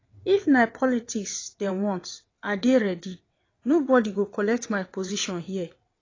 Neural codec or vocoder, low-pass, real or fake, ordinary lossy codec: vocoder, 22.05 kHz, 80 mel bands, WaveNeXt; 7.2 kHz; fake; AAC, 32 kbps